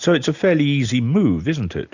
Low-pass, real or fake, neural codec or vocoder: 7.2 kHz; real; none